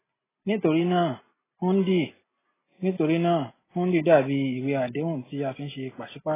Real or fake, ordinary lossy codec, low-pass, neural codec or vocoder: real; AAC, 16 kbps; 3.6 kHz; none